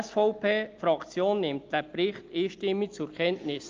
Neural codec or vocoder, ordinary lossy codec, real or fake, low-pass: none; Opus, 32 kbps; real; 7.2 kHz